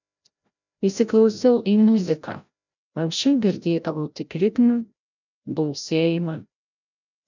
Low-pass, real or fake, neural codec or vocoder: 7.2 kHz; fake; codec, 16 kHz, 0.5 kbps, FreqCodec, larger model